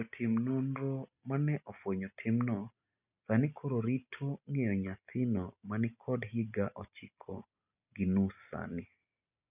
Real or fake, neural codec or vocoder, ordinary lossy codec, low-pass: real; none; none; 3.6 kHz